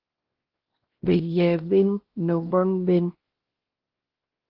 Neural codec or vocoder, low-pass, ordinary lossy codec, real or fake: codec, 16 kHz, 1 kbps, X-Codec, WavLM features, trained on Multilingual LibriSpeech; 5.4 kHz; Opus, 16 kbps; fake